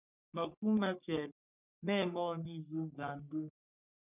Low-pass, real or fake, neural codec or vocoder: 3.6 kHz; fake; codec, 44.1 kHz, 3.4 kbps, Pupu-Codec